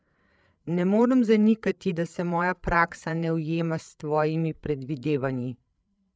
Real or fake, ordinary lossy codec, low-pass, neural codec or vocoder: fake; none; none; codec, 16 kHz, 8 kbps, FreqCodec, larger model